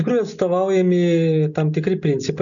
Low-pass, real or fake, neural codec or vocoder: 7.2 kHz; real; none